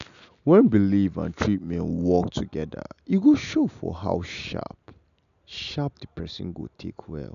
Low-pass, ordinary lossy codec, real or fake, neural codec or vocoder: 7.2 kHz; none; real; none